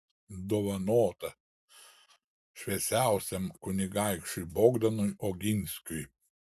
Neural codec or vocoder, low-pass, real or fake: none; 14.4 kHz; real